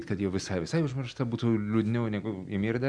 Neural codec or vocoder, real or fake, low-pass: none; real; 9.9 kHz